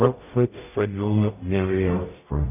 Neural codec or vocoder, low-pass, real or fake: codec, 44.1 kHz, 0.9 kbps, DAC; 3.6 kHz; fake